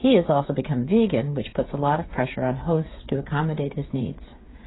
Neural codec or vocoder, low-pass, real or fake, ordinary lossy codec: codec, 16 kHz, 8 kbps, FreqCodec, smaller model; 7.2 kHz; fake; AAC, 16 kbps